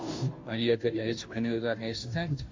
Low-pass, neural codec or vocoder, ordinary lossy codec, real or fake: 7.2 kHz; codec, 16 kHz, 0.5 kbps, FunCodec, trained on Chinese and English, 25 frames a second; MP3, 64 kbps; fake